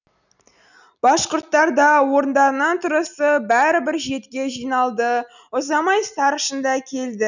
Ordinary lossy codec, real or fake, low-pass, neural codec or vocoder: none; real; 7.2 kHz; none